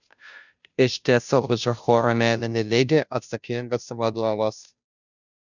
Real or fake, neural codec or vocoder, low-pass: fake; codec, 16 kHz, 0.5 kbps, FunCodec, trained on Chinese and English, 25 frames a second; 7.2 kHz